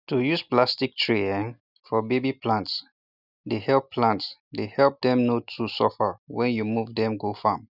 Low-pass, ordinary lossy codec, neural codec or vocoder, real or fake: 5.4 kHz; none; none; real